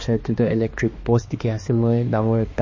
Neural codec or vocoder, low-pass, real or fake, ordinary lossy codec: codec, 16 kHz, 2 kbps, X-Codec, HuBERT features, trained on balanced general audio; 7.2 kHz; fake; MP3, 32 kbps